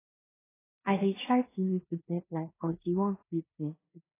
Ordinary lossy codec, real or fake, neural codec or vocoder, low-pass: AAC, 16 kbps; fake; codec, 16 kHz in and 24 kHz out, 0.9 kbps, LongCat-Audio-Codec, four codebook decoder; 3.6 kHz